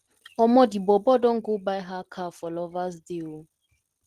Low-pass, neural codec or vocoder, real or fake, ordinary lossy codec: 14.4 kHz; none; real; Opus, 16 kbps